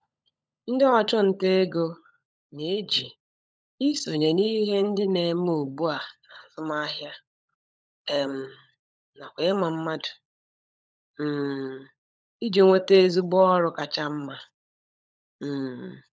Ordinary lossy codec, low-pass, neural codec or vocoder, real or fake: none; none; codec, 16 kHz, 16 kbps, FunCodec, trained on LibriTTS, 50 frames a second; fake